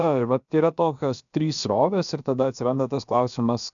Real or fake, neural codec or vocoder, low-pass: fake; codec, 16 kHz, about 1 kbps, DyCAST, with the encoder's durations; 7.2 kHz